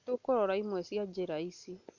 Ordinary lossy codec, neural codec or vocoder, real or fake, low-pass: none; none; real; 7.2 kHz